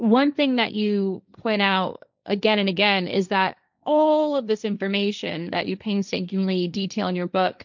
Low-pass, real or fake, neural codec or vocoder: 7.2 kHz; fake; codec, 16 kHz, 1.1 kbps, Voila-Tokenizer